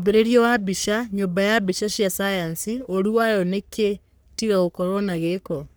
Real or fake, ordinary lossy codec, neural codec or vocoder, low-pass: fake; none; codec, 44.1 kHz, 3.4 kbps, Pupu-Codec; none